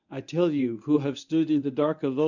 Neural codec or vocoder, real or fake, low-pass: codec, 24 kHz, 0.9 kbps, WavTokenizer, medium speech release version 1; fake; 7.2 kHz